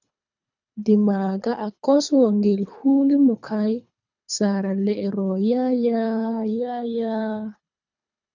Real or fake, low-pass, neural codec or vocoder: fake; 7.2 kHz; codec, 24 kHz, 3 kbps, HILCodec